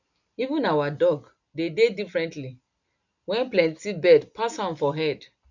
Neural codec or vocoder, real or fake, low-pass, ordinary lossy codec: none; real; 7.2 kHz; none